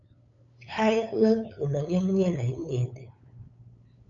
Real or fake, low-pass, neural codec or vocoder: fake; 7.2 kHz; codec, 16 kHz, 8 kbps, FunCodec, trained on LibriTTS, 25 frames a second